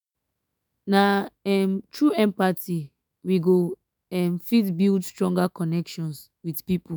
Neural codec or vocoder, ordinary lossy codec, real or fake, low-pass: autoencoder, 48 kHz, 128 numbers a frame, DAC-VAE, trained on Japanese speech; none; fake; none